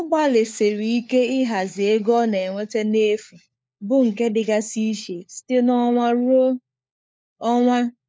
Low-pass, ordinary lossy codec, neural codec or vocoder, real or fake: none; none; codec, 16 kHz, 4 kbps, FunCodec, trained on LibriTTS, 50 frames a second; fake